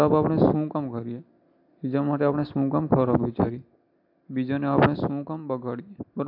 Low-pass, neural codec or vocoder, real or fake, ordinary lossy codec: 5.4 kHz; none; real; AAC, 48 kbps